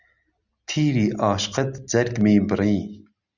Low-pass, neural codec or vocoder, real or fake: 7.2 kHz; none; real